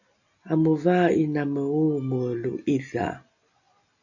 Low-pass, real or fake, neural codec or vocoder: 7.2 kHz; real; none